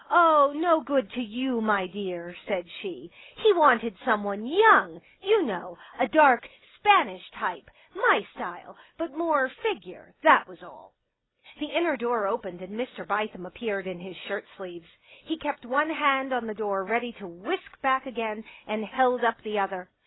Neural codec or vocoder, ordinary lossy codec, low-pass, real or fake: none; AAC, 16 kbps; 7.2 kHz; real